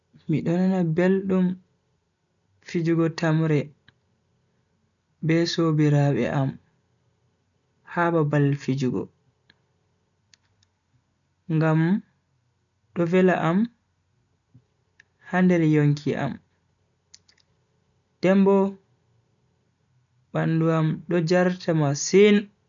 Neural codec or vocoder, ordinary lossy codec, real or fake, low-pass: none; none; real; 7.2 kHz